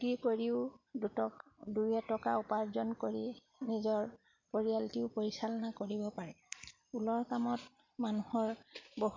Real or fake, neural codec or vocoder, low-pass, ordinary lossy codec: real; none; 7.2 kHz; MP3, 48 kbps